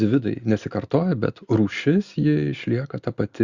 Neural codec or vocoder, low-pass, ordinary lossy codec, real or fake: none; 7.2 kHz; Opus, 64 kbps; real